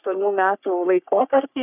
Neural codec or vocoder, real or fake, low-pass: codec, 44.1 kHz, 3.4 kbps, Pupu-Codec; fake; 3.6 kHz